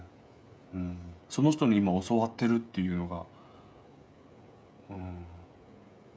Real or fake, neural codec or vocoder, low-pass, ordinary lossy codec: fake; codec, 16 kHz, 16 kbps, FreqCodec, smaller model; none; none